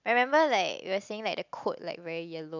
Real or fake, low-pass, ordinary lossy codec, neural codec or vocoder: real; 7.2 kHz; none; none